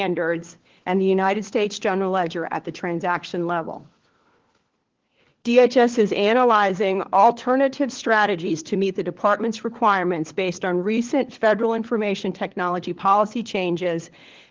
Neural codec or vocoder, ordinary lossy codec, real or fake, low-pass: codec, 16 kHz, 4 kbps, FunCodec, trained on LibriTTS, 50 frames a second; Opus, 16 kbps; fake; 7.2 kHz